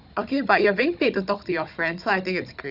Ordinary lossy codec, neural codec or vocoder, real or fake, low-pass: none; codec, 16 kHz, 16 kbps, FunCodec, trained on Chinese and English, 50 frames a second; fake; 5.4 kHz